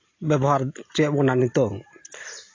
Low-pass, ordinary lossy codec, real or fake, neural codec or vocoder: 7.2 kHz; AAC, 32 kbps; real; none